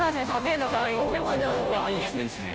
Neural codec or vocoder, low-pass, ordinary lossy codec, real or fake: codec, 16 kHz, 0.5 kbps, FunCodec, trained on Chinese and English, 25 frames a second; none; none; fake